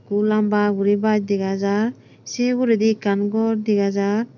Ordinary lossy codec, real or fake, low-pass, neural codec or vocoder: none; real; 7.2 kHz; none